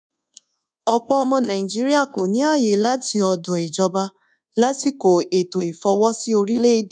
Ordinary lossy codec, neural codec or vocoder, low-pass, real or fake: none; codec, 24 kHz, 1.2 kbps, DualCodec; 9.9 kHz; fake